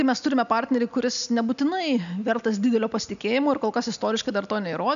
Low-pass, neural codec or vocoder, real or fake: 7.2 kHz; none; real